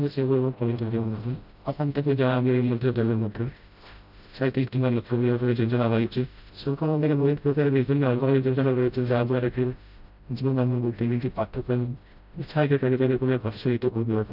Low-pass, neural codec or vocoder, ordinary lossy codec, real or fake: 5.4 kHz; codec, 16 kHz, 0.5 kbps, FreqCodec, smaller model; none; fake